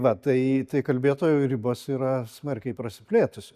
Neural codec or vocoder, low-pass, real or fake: none; 14.4 kHz; real